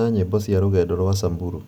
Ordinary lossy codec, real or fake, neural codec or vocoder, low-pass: none; real; none; none